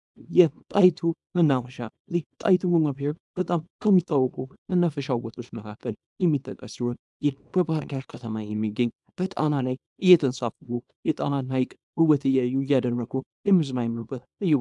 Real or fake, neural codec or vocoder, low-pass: fake; codec, 24 kHz, 0.9 kbps, WavTokenizer, small release; 10.8 kHz